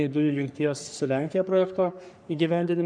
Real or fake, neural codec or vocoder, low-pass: fake; codec, 44.1 kHz, 3.4 kbps, Pupu-Codec; 9.9 kHz